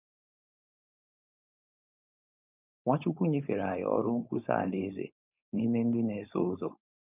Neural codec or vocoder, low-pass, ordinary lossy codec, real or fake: codec, 16 kHz, 4.8 kbps, FACodec; 3.6 kHz; none; fake